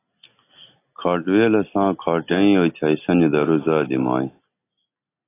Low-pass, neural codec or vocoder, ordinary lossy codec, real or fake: 3.6 kHz; none; AAC, 24 kbps; real